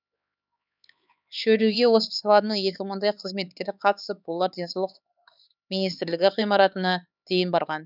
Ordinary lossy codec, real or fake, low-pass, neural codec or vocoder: none; fake; 5.4 kHz; codec, 16 kHz, 4 kbps, X-Codec, HuBERT features, trained on LibriSpeech